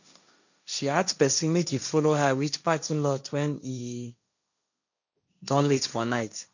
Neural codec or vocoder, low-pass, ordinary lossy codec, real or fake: codec, 16 kHz, 1.1 kbps, Voila-Tokenizer; 7.2 kHz; none; fake